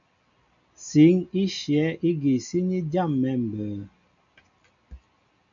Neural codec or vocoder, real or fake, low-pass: none; real; 7.2 kHz